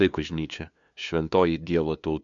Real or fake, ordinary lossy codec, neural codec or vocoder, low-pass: fake; MP3, 64 kbps; codec, 16 kHz, 2 kbps, FunCodec, trained on LibriTTS, 25 frames a second; 7.2 kHz